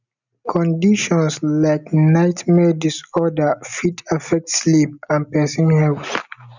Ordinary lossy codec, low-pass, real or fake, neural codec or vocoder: none; 7.2 kHz; real; none